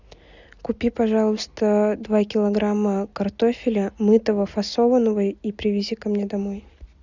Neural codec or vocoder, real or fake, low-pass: none; real; 7.2 kHz